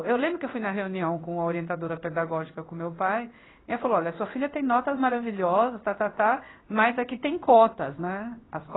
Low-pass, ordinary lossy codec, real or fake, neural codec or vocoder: 7.2 kHz; AAC, 16 kbps; fake; codec, 16 kHz in and 24 kHz out, 1 kbps, XY-Tokenizer